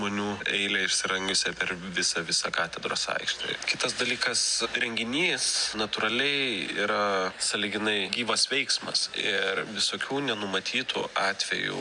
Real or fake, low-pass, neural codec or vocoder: real; 9.9 kHz; none